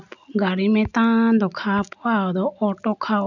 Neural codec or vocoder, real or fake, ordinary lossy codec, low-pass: none; real; none; 7.2 kHz